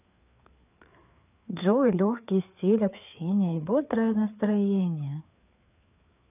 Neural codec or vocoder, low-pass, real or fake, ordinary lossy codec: codec, 16 kHz, 4 kbps, FreqCodec, larger model; 3.6 kHz; fake; none